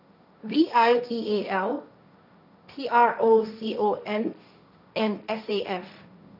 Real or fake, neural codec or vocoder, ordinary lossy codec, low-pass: fake; codec, 16 kHz, 1.1 kbps, Voila-Tokenizer; none; 5.4 kHz